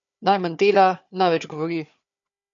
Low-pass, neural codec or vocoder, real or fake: 7.2 kHz; codec, 16 kHz, 4 kbps, FunCodec, trained on Chinese and English, 50 frames a second; fake